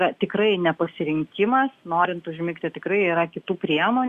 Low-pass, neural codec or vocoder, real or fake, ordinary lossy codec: 14.4 kHz; none; real; Opus, 64 kbps